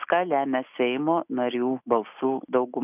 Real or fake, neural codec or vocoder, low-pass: real; none; 3.6 kHz